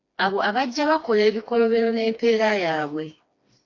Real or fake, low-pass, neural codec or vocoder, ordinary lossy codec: fake; 7.2 kHz; codec, 16 kHz, 2 kbps, FreqCodec, smaller model; AAC, 48 kbps